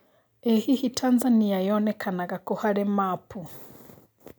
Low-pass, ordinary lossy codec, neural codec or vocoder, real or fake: none; none; none; real